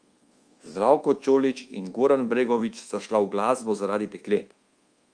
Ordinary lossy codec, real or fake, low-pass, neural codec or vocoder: Opus, 32 kbps; fake; 9.9 kHz; codec, 24 kHz, 1.2 kbps, DualCodec